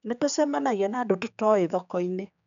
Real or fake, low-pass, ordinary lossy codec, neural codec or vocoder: fake; 7.2 kHz; none; codec, 16 kHz, 4 kbps, X-Codec, HuBERT features, trained on general audio